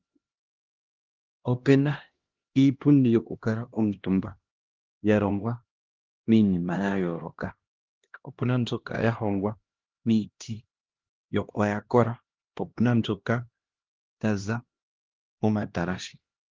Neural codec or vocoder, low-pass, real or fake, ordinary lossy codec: codec, 16 kHz, 1 kbps, X-Codec, HuBERT features, trained on LibriSpeech; 7.2 kHz; fake; Opus, 16 kbps